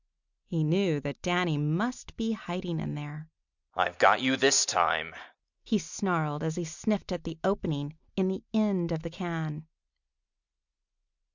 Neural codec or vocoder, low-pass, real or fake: none; 7.2 kHz; real